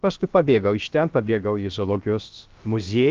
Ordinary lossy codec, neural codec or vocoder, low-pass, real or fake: Opus, 32 kbps; codec, 16 kHz, about 1 kbps, DyCAST, with the encoder's durations; 7.2 kHz; fake